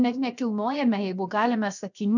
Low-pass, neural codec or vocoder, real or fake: 7.2 kHz; codec, 16 kHz, 0.7 kbps, FocalCodec; fake